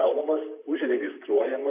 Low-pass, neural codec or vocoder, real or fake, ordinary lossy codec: 3.6 kHz; vocoder, 44.1 kHz, 128 mel bands, Pupu-Vocoder; fake; MP3, 32 kbps